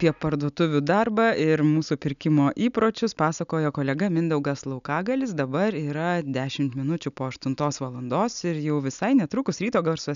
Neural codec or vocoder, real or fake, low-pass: none; real; 7.2 kHz